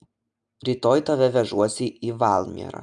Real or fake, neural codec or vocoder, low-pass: real; none; 9.9 kHz